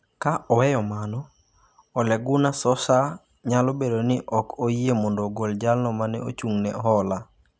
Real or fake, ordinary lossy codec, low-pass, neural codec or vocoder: real; none; none; none